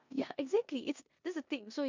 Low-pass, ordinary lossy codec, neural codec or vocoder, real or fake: 7.2 kHz; MP3, 64 kbps; codec, 16 kHz in and 24 kHz out, 0.9 kbps, LongCat-Audio-Codec, four codebook decoder; fake